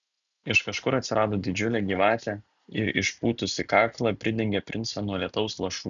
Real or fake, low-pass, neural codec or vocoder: real; 7.2 kHz; none